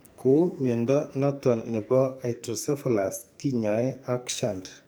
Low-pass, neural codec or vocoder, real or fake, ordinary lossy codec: none; codec, 44.1 kHz, 2.6 kbps, SNAC; fake; none